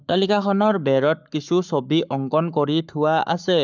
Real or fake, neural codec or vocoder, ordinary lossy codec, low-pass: fake; codec, 44.1 kHz, 7.8 kbps, Pupu-Codec; none; 7.2 kHz